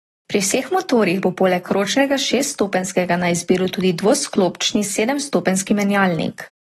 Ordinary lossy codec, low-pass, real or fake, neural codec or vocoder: AAC, 32 kbps; 19.8 kHz; real; none